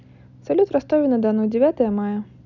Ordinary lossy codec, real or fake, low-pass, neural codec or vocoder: none; real; 7.2 kHz; none